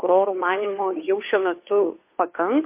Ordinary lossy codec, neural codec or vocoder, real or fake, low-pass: MP3, 24 kbps; codec, 16 kHz, 2 kbps, FunCodec, trained on Chinese and English, 25 frames a second; fake; 3.6 kHz